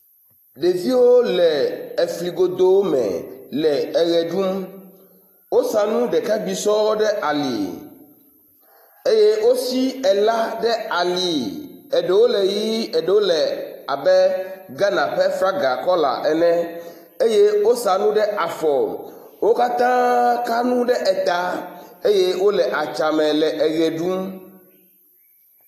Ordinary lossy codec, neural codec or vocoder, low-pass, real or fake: AAC, 48 kbps; none; 14.4 kHz; real